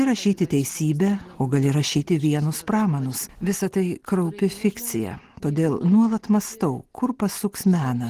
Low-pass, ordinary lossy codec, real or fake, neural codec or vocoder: 14.4 kHz; Opus, 16 kbps; real; none